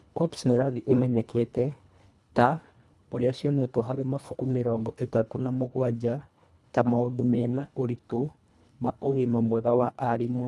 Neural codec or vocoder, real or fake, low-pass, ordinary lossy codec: codec, 24 kHz, 1.5 kbps, HILCodec; fake; none; none